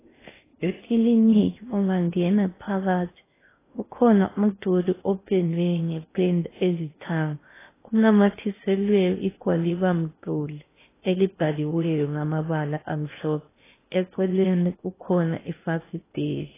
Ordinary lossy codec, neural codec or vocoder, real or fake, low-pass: AAC, 16 kbps; codec, 16 kHz in and 24 kHz out, 0.6 kbps, FocalCodec, streaming, 2048 codes; fake; 3.6 kHz